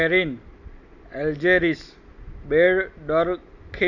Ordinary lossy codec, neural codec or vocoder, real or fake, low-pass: none; none; real; 7.2 kHz